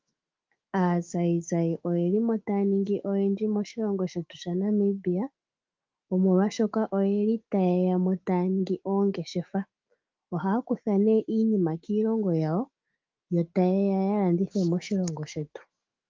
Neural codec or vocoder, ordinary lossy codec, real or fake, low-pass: autoencoder, 48 kHz, 128 numbers a frame, DAC-VAE, trained on Japanese speech; Opus, 32 kbps; fake; 7.2 kHz